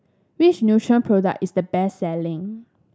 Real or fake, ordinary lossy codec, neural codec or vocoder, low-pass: real; none; none; none